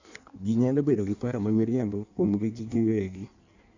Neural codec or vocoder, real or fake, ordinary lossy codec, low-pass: codec, 16 kHz in and 24 kHz out, 1.1 kbps, FireRedTTS-2 codec; fake; none; 7.2 kHz